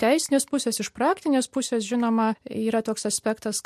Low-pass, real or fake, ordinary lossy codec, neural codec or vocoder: 14.4 kHz; real; MP3, 64 kbps; none